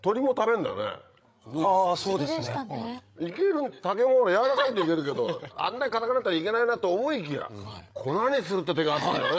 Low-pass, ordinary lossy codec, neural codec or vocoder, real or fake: none; none; codec, 16 kHz, 8 kbps, FreqCodec, larger model; fake